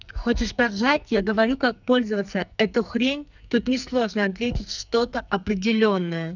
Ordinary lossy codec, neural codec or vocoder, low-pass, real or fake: none; codec, 44.1 kHz, 2.6 kbps, SNAC; 7.2 kHz; fake